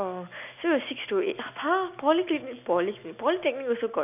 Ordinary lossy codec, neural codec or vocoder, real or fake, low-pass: none; none; real; 3.6 kHz